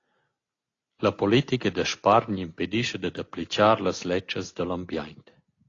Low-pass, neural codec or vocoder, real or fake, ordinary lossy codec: 7.2 kHz; none; real; AAC, 32 kbps